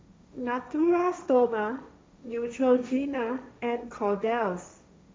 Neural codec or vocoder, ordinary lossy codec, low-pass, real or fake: codec, 16 kHz, 1.1 kbps, Voila-Tokenizer; none; none; fake